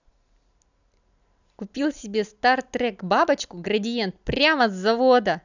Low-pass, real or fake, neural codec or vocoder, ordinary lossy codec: 7.2 kHz; real; none; none